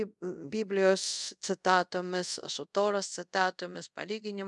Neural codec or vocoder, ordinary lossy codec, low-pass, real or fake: codec, 24 kHz, 0.5 kbps, DualCodec; MP3, 96 kbps; 10.8 kHz; fake